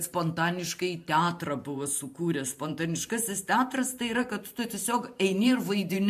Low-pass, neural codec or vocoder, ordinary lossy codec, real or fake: 14.4 kHz; vocoder, 44.1 kHz, 128 mel bands every 512 samples, BigVGAN v2; MP3, 64 kbps; fake